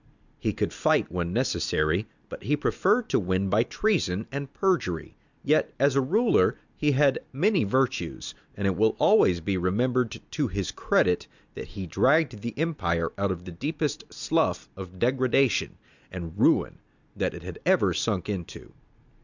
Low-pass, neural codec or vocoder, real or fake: 7.2 kHz; none; real